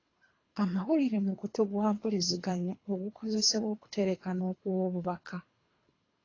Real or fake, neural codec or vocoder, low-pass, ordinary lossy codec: fake; codec, 24 kHz, 3 kbps, HILCodec; 7.2 kHz; AAC, 32 kbps